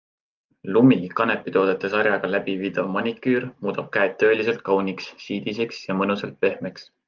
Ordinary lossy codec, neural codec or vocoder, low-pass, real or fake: Opus, 24 kbps; none; 7.2 kHz; real